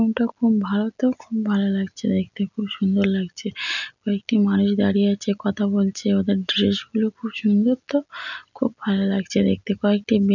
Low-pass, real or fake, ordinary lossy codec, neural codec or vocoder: 7.2 kHz; real; none; none